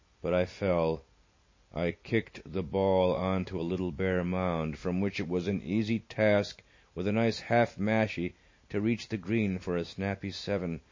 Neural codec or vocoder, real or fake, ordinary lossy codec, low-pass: none; real; MP3, 32 kbps; 7.2 kHz